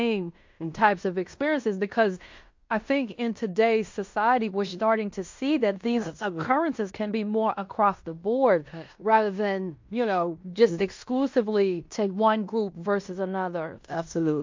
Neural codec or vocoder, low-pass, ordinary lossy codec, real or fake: codec, 16 kHz in and 24 kHz out, 0.9 kbps, LongCat-Audio-Codec, fine tuned four codebook decoder; 7.2 kHz; MP3, 48 kbps; fake